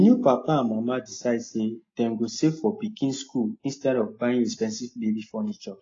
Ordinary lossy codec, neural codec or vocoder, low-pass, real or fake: AAC, 32 kbps; none; 7.2 kHz; real